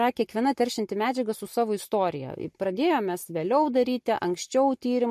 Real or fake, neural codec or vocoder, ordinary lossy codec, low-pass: real; none; MP3, 64 kbps; 14.4 kHz